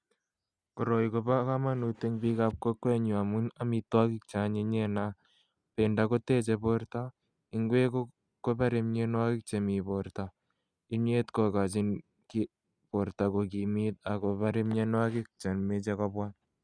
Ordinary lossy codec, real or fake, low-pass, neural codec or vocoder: Opus, 64 kbps; real; 9.9 kHz; none